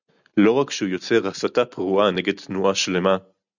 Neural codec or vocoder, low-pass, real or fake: none; 7.2 kHz; real